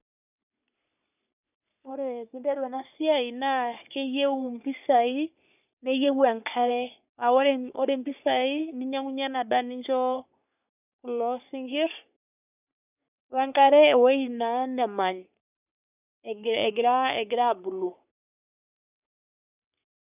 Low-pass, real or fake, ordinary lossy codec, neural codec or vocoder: 3.6 kHz; fake; none; codec, 44.1 kHz, 3.4 kbps, Pupu-Codec